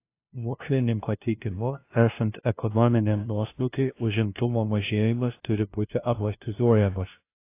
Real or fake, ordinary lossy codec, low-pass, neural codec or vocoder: fake; AAC, 24 kbps; 3.6 kHz; codec, 16 kHz, 0.5 kbps, FunCodec, trained on LibriTTS, 25 frames a second